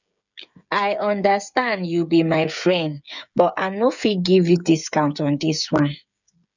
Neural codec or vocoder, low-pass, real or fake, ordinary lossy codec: codec, 16 kHz, 8 kbps, FreqCodec, smaller model; 7.2 kHz; fake; none